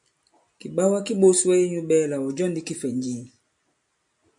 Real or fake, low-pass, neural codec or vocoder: real; 10.8 kHz; none